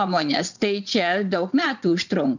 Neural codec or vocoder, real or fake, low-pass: vocoder, 22.05 kHz, 80 mel bands, WaveNeXt; fake; 7.2 kHz